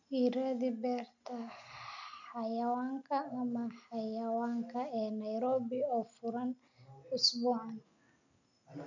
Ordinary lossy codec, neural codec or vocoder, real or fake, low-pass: none; none; real; 7.2 kHz